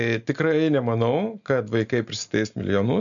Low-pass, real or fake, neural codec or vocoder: 7.2 kHz; real; none